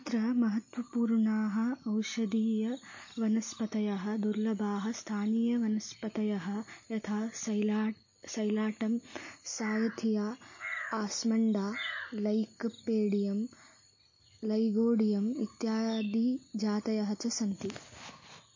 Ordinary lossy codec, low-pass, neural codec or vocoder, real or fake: MP3, 32 kbps; 7.2 kHz; none; real